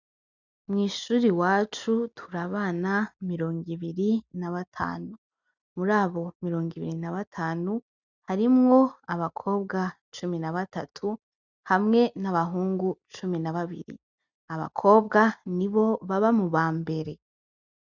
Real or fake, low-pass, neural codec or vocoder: real; 7.2 kHz; none